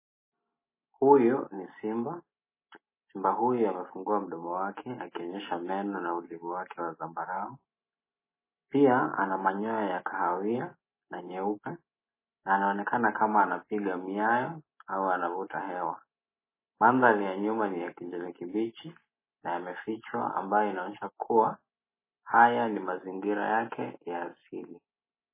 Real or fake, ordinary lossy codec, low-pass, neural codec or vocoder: real; MP3, 16 kbps; 3.6 kHz; none